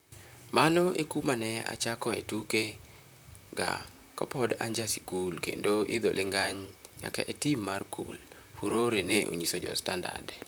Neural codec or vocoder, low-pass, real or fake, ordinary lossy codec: vocoder, 44.1 kHz, 128 mel bands, Pupu-Vocoder; none; fake; none